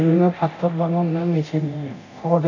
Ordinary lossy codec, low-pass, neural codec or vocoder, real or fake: none; 7.2 kHz; codec, 24 kHz, 0.9 kbps, DualCodec; fake